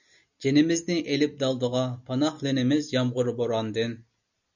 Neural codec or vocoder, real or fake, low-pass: none; real; 7.2 kHz